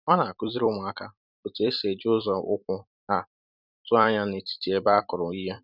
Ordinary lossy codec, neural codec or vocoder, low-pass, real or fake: none; vocoder, 44.1 kHz, 128 mel bands every 256 samples, BigVGAN v2; 5.4 kHz; fake